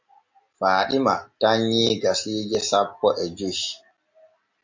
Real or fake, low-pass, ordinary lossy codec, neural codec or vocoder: real; 7.2 kHz; MP3, 64 kbps; none